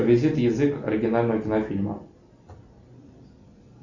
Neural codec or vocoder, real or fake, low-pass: none; real; 7.2 kHz